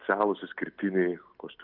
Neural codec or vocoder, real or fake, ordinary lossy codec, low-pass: none; real; Opus, 32 kbps; 5.4 kHz